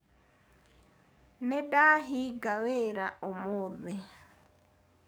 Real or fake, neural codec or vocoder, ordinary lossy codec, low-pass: fake; codec, 44.1 kHz, 7.8 kbps, DAC; none; none